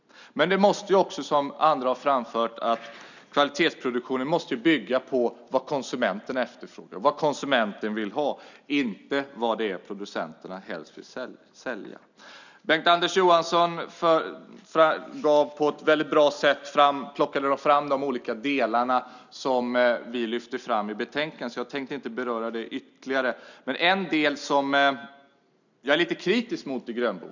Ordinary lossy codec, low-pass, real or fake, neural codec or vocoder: none; 7.2 kHz; real; none